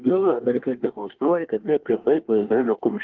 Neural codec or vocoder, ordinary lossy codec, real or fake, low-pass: codec, 24 kHz, 1 kbps, SNAC; Opus, 16 kbps; fake; 7.2 kHz